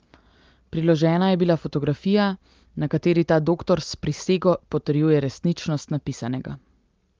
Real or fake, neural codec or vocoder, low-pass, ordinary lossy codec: real; none; 7.2 kHz; Opus, 32 kbps